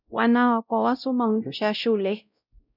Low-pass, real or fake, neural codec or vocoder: 5.4 kHz; fake; codec, 16 kHz, 0.5 kbps, X-Codec, WavLM features, trained on Multilingual LibriSpeech